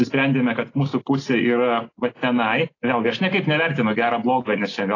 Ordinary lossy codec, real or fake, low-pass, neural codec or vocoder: AAC, 32 kbps; real; 7.2 kHz; none